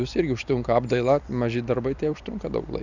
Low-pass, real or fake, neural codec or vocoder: 7.2 kHz; fake; vocoder, 44.1 kHz, 128 mel bands every 256 samples, BigVGAN v2